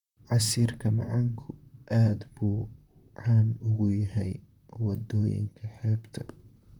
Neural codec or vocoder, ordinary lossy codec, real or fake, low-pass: vocoder, 44.1 kHz, 128 mel bands, Pupu-Vocoder; none; fake; 19.8 kHz